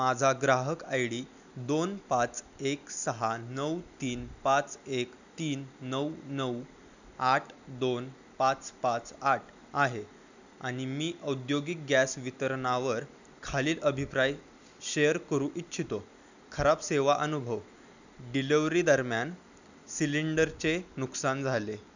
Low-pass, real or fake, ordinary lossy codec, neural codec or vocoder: 7.2 kHz; real; none; none